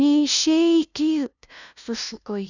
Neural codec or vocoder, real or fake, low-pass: codec, 16 kHz, 0.5 kbps, FunCodec, trained on LibriTTS, 25 frames a second; fake; 7.2 kHz